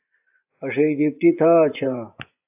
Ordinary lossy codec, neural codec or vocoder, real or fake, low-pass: AAC, 32 kbps; vocoder, 24 kHz, 100 mel bands, Vocos; fake; 3.6 kHz